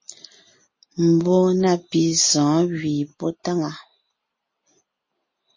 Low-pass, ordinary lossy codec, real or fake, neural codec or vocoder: 7.2 kHz; MP3, 32 kbps; real; none